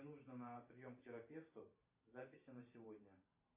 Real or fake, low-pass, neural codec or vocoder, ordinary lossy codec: fake; 3.6 kHz; vocoder, 44.1 kHz, 128 mel bands every 512 samples, BigVGAN v2; MP3, 24 kbps